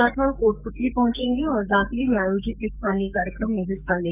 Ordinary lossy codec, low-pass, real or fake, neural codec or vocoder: none; 3.6 kHz; fake; codec, 44.1 kHz, 2.6 kbps, SNAC